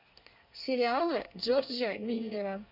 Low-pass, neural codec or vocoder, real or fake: 5.4 kHz; codec, 24 kHz, 1 kbps, SNAC; fake